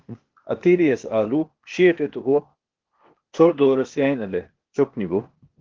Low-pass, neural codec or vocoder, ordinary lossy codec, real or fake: 7.2 kHz; codec, 16 kHz, 0.8 kbps, ZipCodec; Opus, 16 kbps; fake